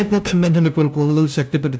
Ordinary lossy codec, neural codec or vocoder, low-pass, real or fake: none; codec, 16 kHz, 0.5 kbps, FunCodec, trained on LibriTTS, 25 frames a second; none; fake